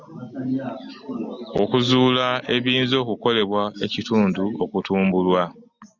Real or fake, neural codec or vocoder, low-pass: real; none; 7.2 kHz